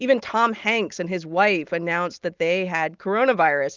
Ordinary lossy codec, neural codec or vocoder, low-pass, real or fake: Opus, 24 kbps; none; 7.2 kHz; real